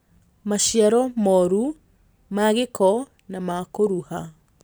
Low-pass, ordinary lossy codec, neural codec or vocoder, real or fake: none; none; none; real